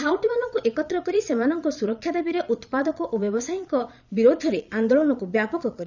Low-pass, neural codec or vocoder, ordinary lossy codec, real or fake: 7.2 kHz; vocoder, 22.05 kHz, 80 mel bands, Vocos; none; fake